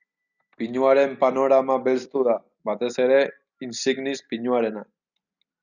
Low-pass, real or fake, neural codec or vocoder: 7.2 kHz; real; none